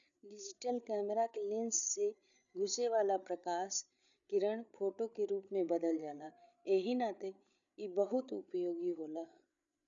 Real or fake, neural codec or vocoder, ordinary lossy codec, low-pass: fake; codec, 16 kHz, 8 kbps, FreqCodec, larger model; none; 7.2 kHz